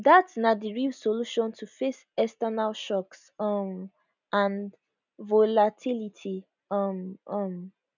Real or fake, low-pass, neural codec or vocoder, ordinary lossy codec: real; 7.2 kHz; none; none